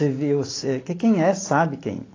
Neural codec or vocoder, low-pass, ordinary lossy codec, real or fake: none; 7.2 kHz; AAC, 32 kbps; real